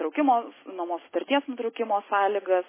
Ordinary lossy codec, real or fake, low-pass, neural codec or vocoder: MP3, 16 kbps; real; 3.6 kHz; none